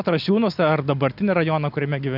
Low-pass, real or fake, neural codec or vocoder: 5.4 kHz; real; none